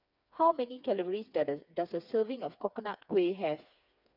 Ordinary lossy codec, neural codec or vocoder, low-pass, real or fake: none; codec, 16 kHz, 4 kbps, FreqCodec, smaller model; 5.4 kHz; fake